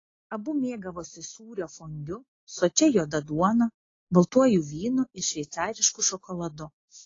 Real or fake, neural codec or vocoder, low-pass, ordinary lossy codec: real; none; 7.2 kHz; AAC, 32 kbps